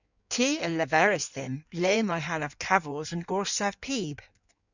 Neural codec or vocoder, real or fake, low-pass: codec, 16 kHz in and 24 kHz out, 1.1 kbps, FireRedTTS-2 codec; fake; 7.2 kHz